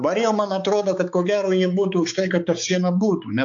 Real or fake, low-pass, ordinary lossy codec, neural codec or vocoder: fake; 7.2 kHz; AAC, 64 kbps; codec, 16 kHz, 4 kbps, X-Codec, HuBERT features, trained on balanced general audio